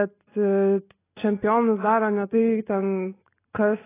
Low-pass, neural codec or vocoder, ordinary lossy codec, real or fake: 3.6 kHz; none; AAC, 16 kbps; real